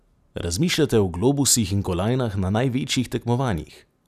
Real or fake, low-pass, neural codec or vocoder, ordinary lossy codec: real; 14.4 kHz; none; none